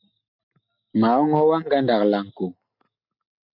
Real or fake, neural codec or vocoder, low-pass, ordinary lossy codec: real; none; 5.4 kHz; MP3, 48 kbps